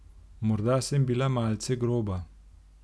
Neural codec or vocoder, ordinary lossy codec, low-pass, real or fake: none; none; none; real